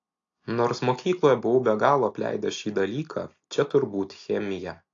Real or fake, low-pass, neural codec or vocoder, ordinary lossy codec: real; 7.2 kHz; none; AAC, 48 kbps